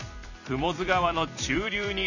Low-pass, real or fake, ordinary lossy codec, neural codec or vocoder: 7.2 kHz; fake; none; vocoder, 44.1 kHz, 128 mel bands every 256 samples, BigVGAN v2